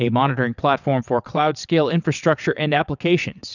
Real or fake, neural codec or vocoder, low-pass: fake; vocoder, 22.05 kHz, 80 mel bands, WaveNeXt; 7.2 kHz